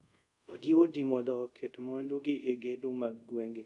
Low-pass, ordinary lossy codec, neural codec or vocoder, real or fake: 10.8 kHz; none; codec, 24 kHz, 0.5 kbps, DualCodec; fake